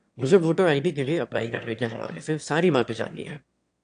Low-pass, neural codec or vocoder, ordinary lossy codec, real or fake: 9.9 kHz; autoencoder, 22.05 kHz, a latent of 192 numbers a frame, VITS, trained on one speaker; AAC, 64 kbps; fake